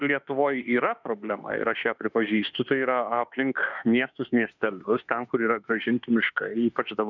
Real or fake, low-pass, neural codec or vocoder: fake; 7.2 kHz; autoencoder, 48 kHz, 32 numbers a frame, DAC-VAE, trained on Japanese speech